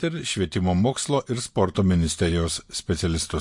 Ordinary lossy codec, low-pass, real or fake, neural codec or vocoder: MP3, 48 kbps; 10.8 kHz; real; none